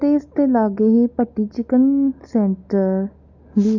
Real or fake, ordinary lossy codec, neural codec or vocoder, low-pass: real; none; none; 7.2 kHz